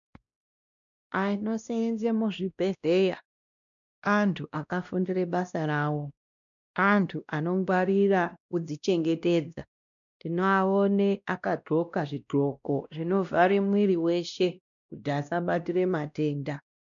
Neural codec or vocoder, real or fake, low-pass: codec, 16 kHz, 1 kbps, X-Codec, WavLM features, trained on Multilingual LibriSpeech; fake; 7.2 kHz